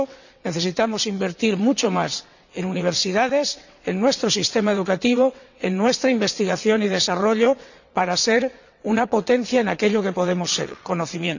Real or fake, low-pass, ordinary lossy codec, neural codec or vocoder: fake; 7.2 kHz; none; vocoder, 22.05 kHz, 80 mel bands, WaveNeXt